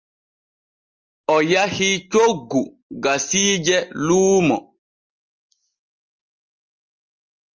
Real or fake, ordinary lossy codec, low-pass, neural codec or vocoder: real; Opus, 24 kbps; 7.2 kHz; none